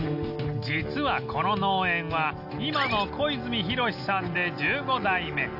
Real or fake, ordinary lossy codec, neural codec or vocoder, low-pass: real; none; none; 5.4 kHz